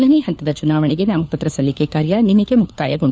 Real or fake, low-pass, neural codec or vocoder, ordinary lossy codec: fake; none; codec, 16 kHz, 4 kbps, FunCodec, trained on LibriTTS, 50 frames a second; none